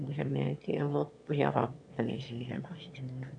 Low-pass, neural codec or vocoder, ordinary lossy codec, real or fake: 9.9 kHz; autoencoder, 22.05 kHz, a latent of 192 numbers a frame, VITS, trained on one speaker; none; fake